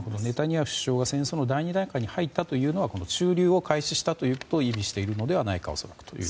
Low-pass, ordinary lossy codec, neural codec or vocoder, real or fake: none; none; none; real